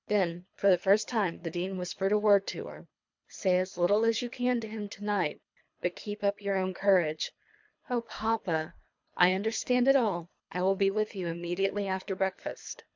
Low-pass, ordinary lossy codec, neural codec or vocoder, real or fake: 7.2 kHz; MP3, 64 kbps; codec, 24 kHz, 3 kbps, HILCodec; fake